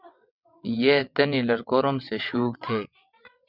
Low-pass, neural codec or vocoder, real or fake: 5.4 kHz; vocoder, 22.05 kHz, 80 mel bands, WaveNeXt; fake